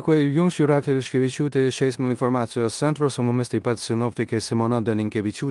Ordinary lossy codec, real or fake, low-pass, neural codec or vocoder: Opus, 32 kbps; fake; 10.8 kHz; codec, 16 kHz in and 24 kHz out, 0.9 kbps, LongCat-Audio-Codec, four codebook decoder